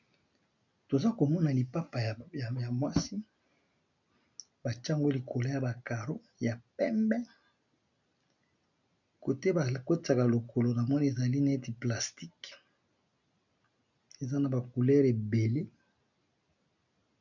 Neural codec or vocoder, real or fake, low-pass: none; real; 7.2 kHz